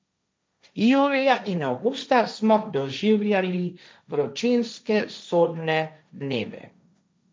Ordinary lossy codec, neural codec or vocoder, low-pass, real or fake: none; codec, 16 kHz, 1.1 kbps, Voila-Tokenizer; none; fake